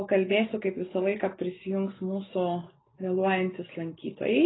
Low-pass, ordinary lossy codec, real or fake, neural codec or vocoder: 7.2 kHz; AAC, 16 kbps; real; none